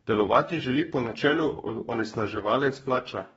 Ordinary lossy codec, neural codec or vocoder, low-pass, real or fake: AAC, 24 kbps; codec, 44.1 kHz, 2.6 kbps, DAC; 19.8 kHz; fake